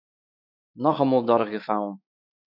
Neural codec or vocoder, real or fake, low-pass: autoencoder, 48 kHz, 128 numbers a frame, DAC-VAE, trained on Japanese speech; fake; 5.4 kHz